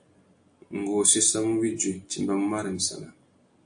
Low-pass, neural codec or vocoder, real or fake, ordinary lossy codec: 9.9 kHz; none; real; AAC, 64 kbps